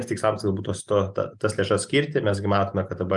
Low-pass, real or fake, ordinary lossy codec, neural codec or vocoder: 10.8 kHz; real; Opus, 32 kbps; none